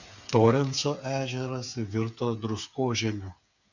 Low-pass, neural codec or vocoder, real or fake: 7.2 kHz; codec, 16 kHz, 8 kbps, FreqCodec, smaller model; fake